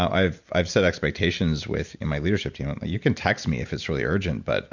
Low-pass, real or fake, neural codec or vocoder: 7.2 kHz; real; none